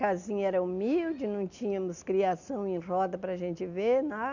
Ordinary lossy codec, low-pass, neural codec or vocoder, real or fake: none; 7.2 kHz; none; real